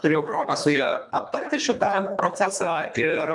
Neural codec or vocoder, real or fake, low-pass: codec, 24 kHz, 1.5 kbps, HILCodec; fake; 10.8 kHz